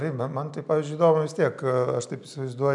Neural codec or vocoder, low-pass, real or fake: none; 10.8 kHz; real